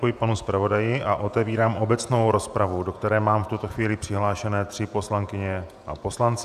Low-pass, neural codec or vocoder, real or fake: 14.4 kHz; vocoder, 48 kHz, 128 mel bands, Vocos; fake